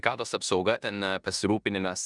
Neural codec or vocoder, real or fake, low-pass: codec, 16 kHz in and 24 kHz out, 0.9 kbps, LongCat-Audio-Codec, four codebook decoder; fake; 10.8 kHz